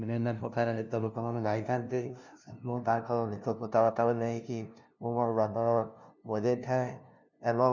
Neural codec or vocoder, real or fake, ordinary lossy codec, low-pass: codec, 16 kHz, 0.5 kbps, FunCodec, trained on LibriTTS, 25 frames a second; fake; none; 7.2 kHz